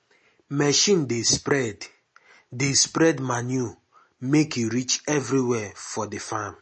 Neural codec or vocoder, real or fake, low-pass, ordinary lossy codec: none; real; 10.8 kHz; MP3, 32 kbps